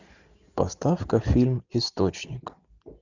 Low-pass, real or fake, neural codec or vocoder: 7.2 kHz; real; none